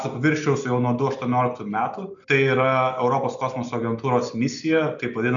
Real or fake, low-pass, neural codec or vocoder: real; 7.2 kHz; none